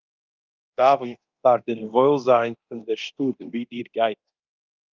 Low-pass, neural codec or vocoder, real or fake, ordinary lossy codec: 7.2 kHz; codec, 24 kHz, 0.9 kbps, DualCodec; fake; Opus, 24 kbps